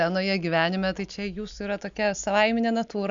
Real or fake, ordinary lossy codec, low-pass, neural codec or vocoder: real; Opus, 64 kbps; 7.2 kHz; none